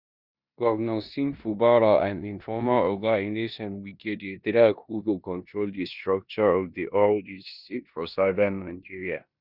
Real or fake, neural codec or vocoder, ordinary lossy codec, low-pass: fake; codec, 16 kHz in and 24 kHz out, 0.9 kbps, LongCat-Audio-Codec, four codebook decoder; Opus, 64 kbps; 5.4 kHz